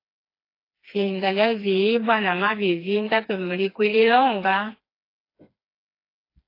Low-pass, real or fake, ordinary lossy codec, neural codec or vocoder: 5.4 kHz; fake; AAC, 32 kbps; codec, 16 kHz, 2 kbps, FreqCodec, smaller model